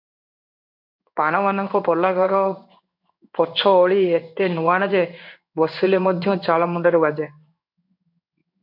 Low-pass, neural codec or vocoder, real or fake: 5.4 kHz; codec, 16 kHz in and 24 kHz out, 1 kbps, XY-Tokenizer; fake